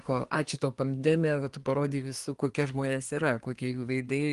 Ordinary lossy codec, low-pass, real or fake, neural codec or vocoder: Opus, 24 kbps; 10.8 kHz; fake; codec, 24 kHz, 1 kbps, SNAC